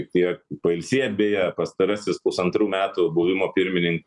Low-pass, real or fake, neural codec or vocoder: 10.8 kHz; real; none